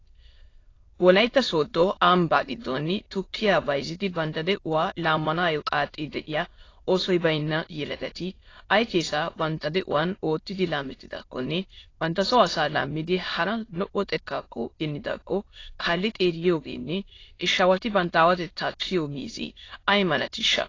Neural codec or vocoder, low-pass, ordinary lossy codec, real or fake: autoencoder, 22.05 kHz, a latent of 192 numbers a frame, VITS, trained on many speakers; 7.2 kHz; AAC, 32 kbps; fake